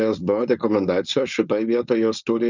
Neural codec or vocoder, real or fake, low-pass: codec, 16 kHz, 4.8 kbps, FACodec; fake; 7.2 kHz